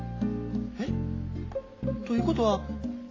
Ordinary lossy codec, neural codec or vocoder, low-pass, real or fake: AAC, 32 kbps; none; 7.2 kHz; real